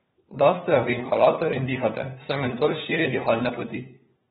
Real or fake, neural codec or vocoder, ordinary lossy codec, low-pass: fake; codec, 16 kHz, 4 kbps, FunCodec, trained on LibriTTS, 50 frames a second; AAC, 16 kbps; 7.2 kHz